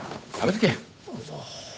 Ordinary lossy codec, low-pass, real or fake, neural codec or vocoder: none; none; fake; codec, 16 kHz, 8 kbps, FunCodec, trained on Chinese and English, 25 frames a second